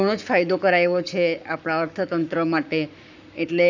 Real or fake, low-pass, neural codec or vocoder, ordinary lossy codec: fake; 7.2 kHz; codec, 44.1 kHz, 7.8 kbps, Pupu-Codec; none